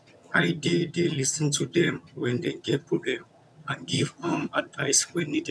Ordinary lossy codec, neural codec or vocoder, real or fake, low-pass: none; vocoder, 22.05 kHz, 80 mel bands, HiFi-GAN; fake; none